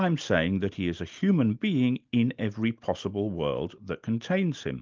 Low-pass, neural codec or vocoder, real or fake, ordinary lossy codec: 7.2 kHz; none; real; Opus, 24 kbps